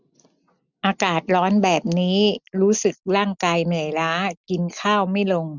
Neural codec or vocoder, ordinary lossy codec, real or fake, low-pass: none; none; real; 7.2 kHz